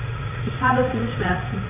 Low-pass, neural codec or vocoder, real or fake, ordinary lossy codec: 3.6 kHz; vocoder, 44.1 kHz, 80 mel bands, Vocos; fake; none